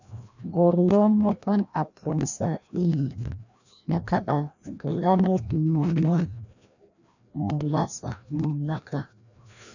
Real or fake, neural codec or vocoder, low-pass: fake; codec, 16 kHz, 1 kbps, FreqCodec, larger model; 7.2 kHz